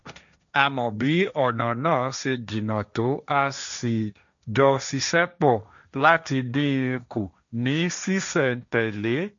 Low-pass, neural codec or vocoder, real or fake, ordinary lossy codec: 7.2 kHz; codec, 16 kHz, 1.1 kbps, Voila-Tokenizer; fake; none